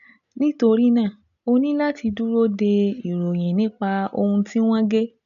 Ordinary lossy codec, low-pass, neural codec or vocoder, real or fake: none; 7.2 kHz; none; real